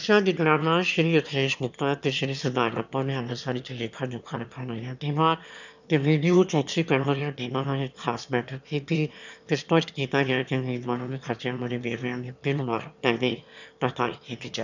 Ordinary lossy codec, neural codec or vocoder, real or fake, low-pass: none; autoencoder, 22.05 kHz, a latent of 192 numbers a frame, VITS, trained on one speaker; fake; 7.2 kHz